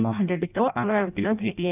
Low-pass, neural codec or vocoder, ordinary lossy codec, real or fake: 3.6 kHz; codec, 16 kHz in and 24 kHz out, 0.6 kbps, FireRedTTS-2 codec; none; fake